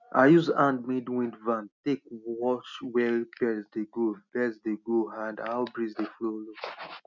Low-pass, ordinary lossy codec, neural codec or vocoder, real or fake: 7.2 kHz; none; none; real